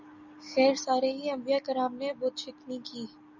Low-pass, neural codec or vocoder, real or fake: 7.2 kHz; none; real